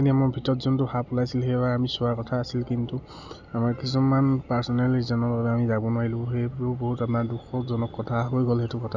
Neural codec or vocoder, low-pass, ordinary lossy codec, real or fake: none; 7.2 kHz; none; real